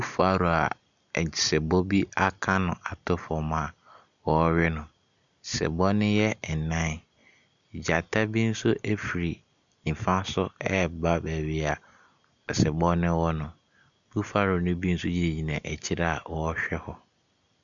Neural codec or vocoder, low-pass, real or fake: none; 7.2 kHz; real